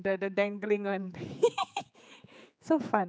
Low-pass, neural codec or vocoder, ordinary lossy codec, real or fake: none; codec, 16 kHz, 4 kbps, X-Codec, HuBERT features, trained on general audio; none; fake